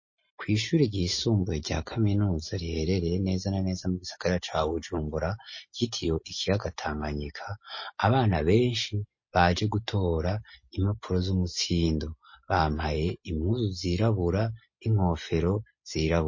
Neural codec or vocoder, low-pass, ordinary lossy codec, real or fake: none; 7.2 kHz; MP3, 32 kbps; real